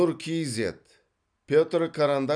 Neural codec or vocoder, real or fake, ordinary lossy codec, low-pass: none; real; none; 9.9 kHz